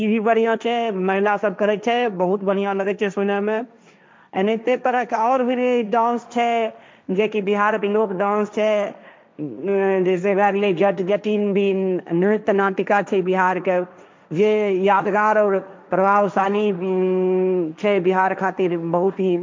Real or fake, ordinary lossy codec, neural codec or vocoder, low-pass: fake; none; codec, 16 kHz, 1.1 kbps, Voila-Tokenizer; none